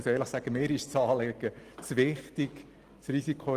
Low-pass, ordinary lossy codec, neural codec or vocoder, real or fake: 14.4 kHz; Opus, 24 kbps; none; real